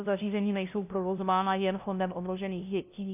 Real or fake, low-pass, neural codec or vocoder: fake; 3.6 kHz; codec, 16 kHz, 0.5 kbps, FunCodec, trained on LibriTTS, 25 frames a second